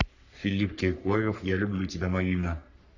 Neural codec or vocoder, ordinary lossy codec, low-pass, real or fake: codec, 44.1 kHz, 3.4 kbps, Pupu-Codec; none; 7.2 kHz; fake